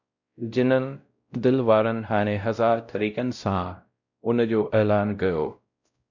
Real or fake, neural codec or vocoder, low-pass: fake; codec, 16 kHz, 0.5 kbps, X-Codec, WavLM features, trained on Multilingual LibriSpeech; 7.2 kHz